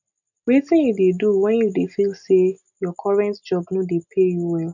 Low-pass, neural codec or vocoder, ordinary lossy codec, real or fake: 7.2 kHz; none; none; real